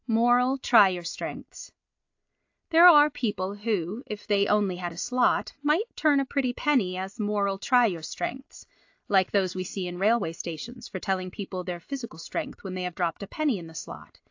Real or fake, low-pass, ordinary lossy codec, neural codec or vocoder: real; 7.2 kHz; AAC, 48 kbps; none